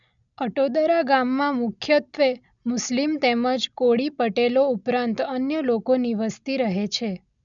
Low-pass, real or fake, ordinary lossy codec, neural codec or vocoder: 7.2 kHz; real; none; none